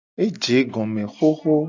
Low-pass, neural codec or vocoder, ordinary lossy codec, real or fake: 7.2 kHz; none; AAC, 48 kbps; real